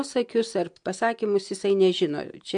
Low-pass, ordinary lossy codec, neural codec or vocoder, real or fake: 9.9 kHz; MP3, 64 kbps; none; real